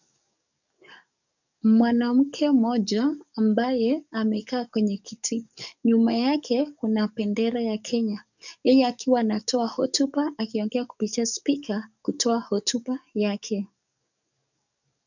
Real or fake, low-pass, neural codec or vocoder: fake; 7.2 kHz; codec, 44.1 kHz, 7.8 kbps, DAC